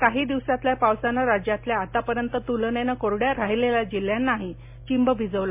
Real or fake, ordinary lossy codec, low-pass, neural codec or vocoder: real; MP3, 32 kbps; 3.6 kHz; none